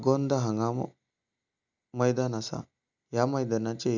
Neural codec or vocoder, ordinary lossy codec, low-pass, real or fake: none; none; 7.2 kHz; real